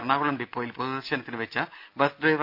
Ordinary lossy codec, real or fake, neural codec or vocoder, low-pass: none; real; none; 5.4 kHz